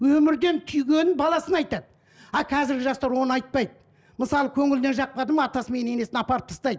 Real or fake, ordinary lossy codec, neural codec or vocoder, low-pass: real; none; none; none